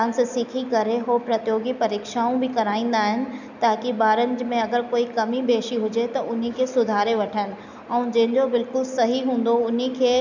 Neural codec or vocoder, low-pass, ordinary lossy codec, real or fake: none; 7.2 kHz; none; real